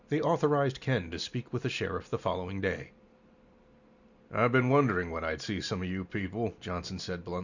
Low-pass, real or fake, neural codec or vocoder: 7.2 kHz; real; none